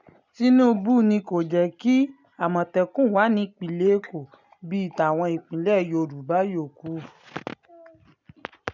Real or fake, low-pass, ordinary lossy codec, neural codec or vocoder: real; 7.2 kHz; none; none